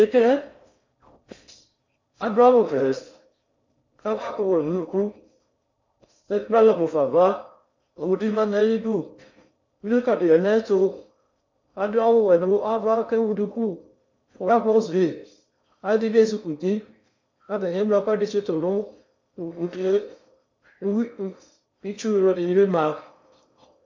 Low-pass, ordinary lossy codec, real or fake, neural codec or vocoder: 7.2 kHz; MP3, 48 kbps; fake; codec, 16 kHz in and 24 kHz out, 0.6 kbps, FocalCodec, streaming, 4096 codes